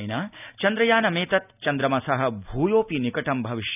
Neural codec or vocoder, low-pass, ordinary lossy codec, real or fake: none; 3.6 kHz; none; real